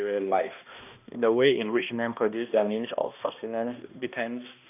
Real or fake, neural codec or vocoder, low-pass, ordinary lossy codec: fake; codec, 16 kHz, 1 kbps, X-Codec, HuBERT features, trained on balanced general audio; 3.6 kHz; none